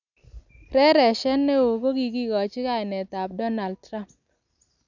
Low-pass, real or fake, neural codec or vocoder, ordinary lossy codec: 7.2 kHz; real; none; none